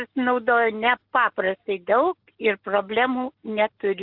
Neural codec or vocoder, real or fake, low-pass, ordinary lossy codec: none; real; 5.4 kHz; Opus, 24 kbps